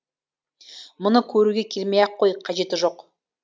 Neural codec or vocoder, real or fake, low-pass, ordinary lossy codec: none; real; none; none